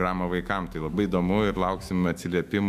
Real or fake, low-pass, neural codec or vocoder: fake; 14.4 kHz; autoencoder, 48 kHz, 128 numbers a frame, DAC-VAE, trained on Japanese speech